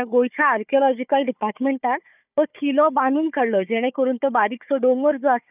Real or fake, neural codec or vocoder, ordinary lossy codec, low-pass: fake; codec, 16 kHz, 4 kbps, FunCodec, trained on Chinese and English, 50 frames a second; none; 3.6 kHz